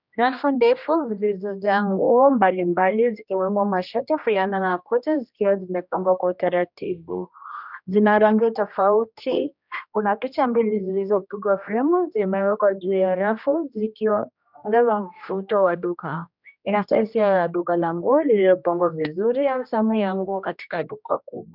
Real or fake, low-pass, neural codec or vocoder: fake; 5.4 kHz; codec, 16 kHz, 1 kbps, X-Codec, HuBERT features, trained on general audio